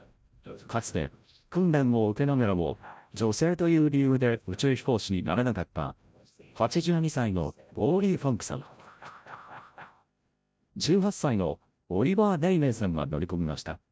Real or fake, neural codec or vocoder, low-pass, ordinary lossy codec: fake; codec, 16 kHz, 0.5 kbps, FreqCodec, larger model; none; none